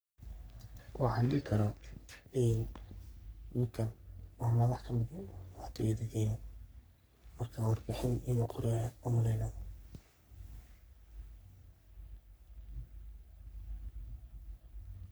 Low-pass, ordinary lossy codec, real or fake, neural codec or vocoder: none; none; fake; codec, 44.1 kHz, 3.4 kbps, Pupu-Codec